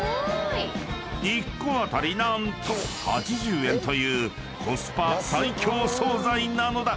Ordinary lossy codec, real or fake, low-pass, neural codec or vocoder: none; real; none; none